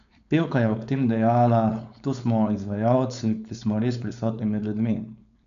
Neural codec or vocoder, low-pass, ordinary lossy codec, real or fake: codec, 16 kHz, 4.8 kbps, FACodec; 7.2 kHz; none; fake